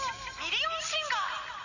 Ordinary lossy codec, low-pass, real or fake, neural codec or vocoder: none; 7.2 kHz; real; none